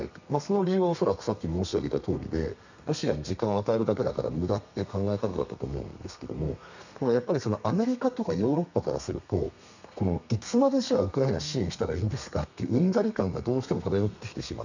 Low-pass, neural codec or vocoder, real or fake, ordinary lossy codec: 7.2 kHz; codec, 32 kHz, 1.9 kbps, SNAC; fake; none